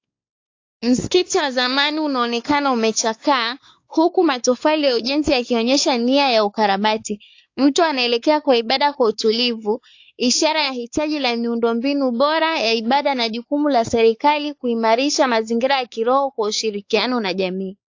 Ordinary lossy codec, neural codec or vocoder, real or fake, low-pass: AAC, 48 kbps; codec, 16 kHz, 4 kbps, X-Codec, WavLM features, trained on Multilingual LibriSpeech; fake; 7.2 kHz